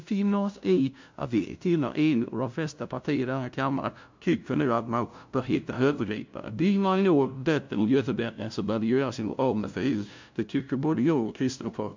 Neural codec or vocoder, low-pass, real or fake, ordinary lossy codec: codec, 16 kHz, 0.5 kbps, FunCodec, trained on LibriTTS, 25 frames a second; 7.2 kHz; fake; MP3, 64 kbps